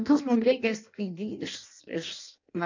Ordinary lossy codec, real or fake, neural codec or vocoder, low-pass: MP3, 64 kbps; fake; codec, 16 kHz in and 24 kHz out, 0.6 kbps, FireRedTTS-2 codec; 7.2 kHz